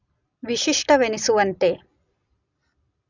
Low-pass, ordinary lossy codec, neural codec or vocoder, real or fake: 7.2 kHz; none; none; real